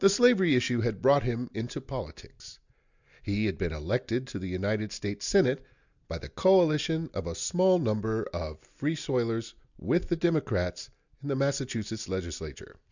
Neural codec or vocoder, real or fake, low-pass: none; real; 7.2 kHz